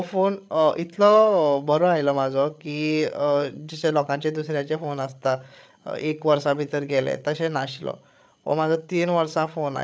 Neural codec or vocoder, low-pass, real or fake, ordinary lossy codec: codec, 16 kHz, 8 kbps, FreqCodec, larger model; none; fake; none